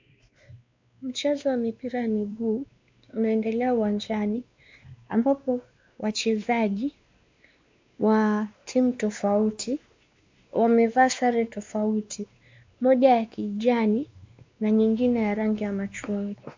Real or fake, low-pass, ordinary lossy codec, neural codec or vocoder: fake; 7.2 kHz; MP3, 64 kbps; codec, 16 kHz, 2 kbps, X-Codec, WavLM features, trained on Multilingual LibriSpeech